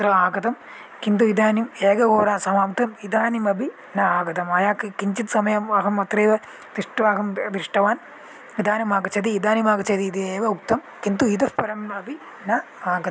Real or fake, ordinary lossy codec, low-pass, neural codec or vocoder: real; none; none; none